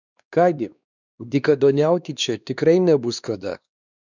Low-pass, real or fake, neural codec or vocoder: 7.2 kHz; fake; codec, 16 kHz, 2 kbps, X-Codec, WavLM features, trained on Multilingual LibriSpeech